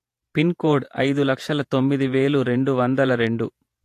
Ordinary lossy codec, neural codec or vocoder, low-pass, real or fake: AAC, 64 kbps; vocoder, 48 kHz, 128 mel bands, Vocos; 14.4 kHz; fake